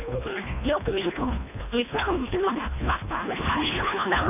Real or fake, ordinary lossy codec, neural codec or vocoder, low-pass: fake; none; codec, 24 kHz, 1.5 kbps, HILCodec; 3.6 kHz